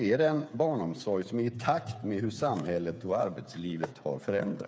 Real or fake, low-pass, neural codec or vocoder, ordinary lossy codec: fake; none; codec, 16 kHz, 8 kbps, FreqCodec, smaller model; none